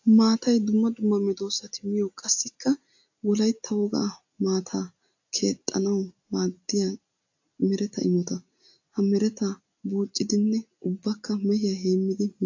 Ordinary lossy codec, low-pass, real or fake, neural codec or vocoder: AAC, 48 kbps; 7.2 kHz; real; none